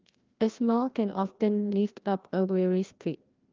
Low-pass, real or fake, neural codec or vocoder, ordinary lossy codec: 7.2 kHz; fake; codec, 16 kHz, 1 kbps, FreqCodec, larger model; Opus, 24 kbps